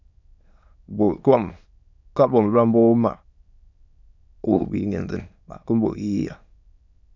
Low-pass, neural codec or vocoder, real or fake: 7.2 kHz; autoencoder, 22.05 kHz, a latent of 192 numbers a frame, VITS, trained on many speakers; fake